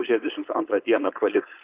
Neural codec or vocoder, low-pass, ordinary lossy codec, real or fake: codec, 16 kHz, 4.8 kbps, FACodec; 3.6 kHz; Opus, 24 kbps; fake